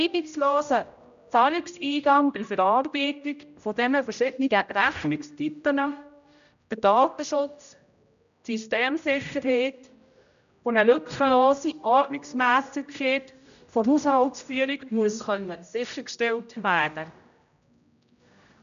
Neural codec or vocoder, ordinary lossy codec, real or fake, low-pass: codec, 16 kHz, 0.5 kbps, X-Codec, HuBERT features, trained on general audio; none; fake; 7.2 kHz